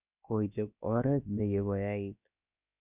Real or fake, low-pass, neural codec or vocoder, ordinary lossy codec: fake; 3.6 kHz; codec, 16 kHz, about 1 kbps, DyCAST, with the encoder's durations; none